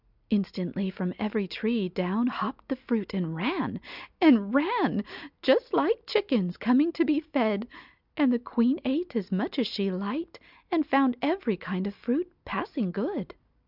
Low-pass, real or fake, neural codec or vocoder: 5.4 kHz; real; none